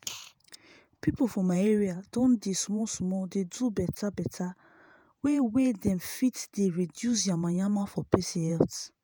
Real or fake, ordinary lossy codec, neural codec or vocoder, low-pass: fake; none; vocoder, 48 kHz, 128 mel bands, Vocos; none